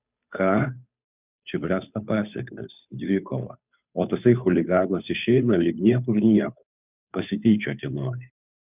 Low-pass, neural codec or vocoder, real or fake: 3.6 kHz; codec, 16 kHz, 2 kbps, FunCodec, trained on Chinese and English, 25 frames a second; fake